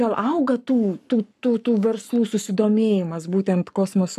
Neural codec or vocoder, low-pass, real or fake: codec, 44.1 kHz, 7.8 kbps, Pupu-Codec; 14.4 kHz; fake